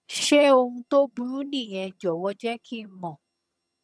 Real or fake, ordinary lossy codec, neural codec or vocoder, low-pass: fake; none; vocoder, 22.05 kHz, 80 mel bands, HiFi-GAN; none